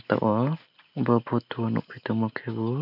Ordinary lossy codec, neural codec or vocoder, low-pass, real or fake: none; none; 5.4 kHz; real